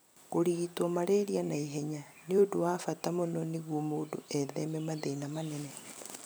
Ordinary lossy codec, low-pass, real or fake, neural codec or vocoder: none; none; fake; vocoder, 44.1 kHz, 128 mel bands every 256 samples, BigVGAN v2